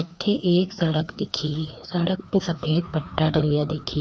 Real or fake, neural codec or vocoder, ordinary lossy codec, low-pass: fake; codec, 16 kHz, 2 kbps, FreqCodec, larger model; none; none